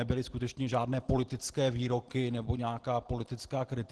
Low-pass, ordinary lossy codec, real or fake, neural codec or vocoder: 10.8 kHz; Opus, 16 kbps; real; none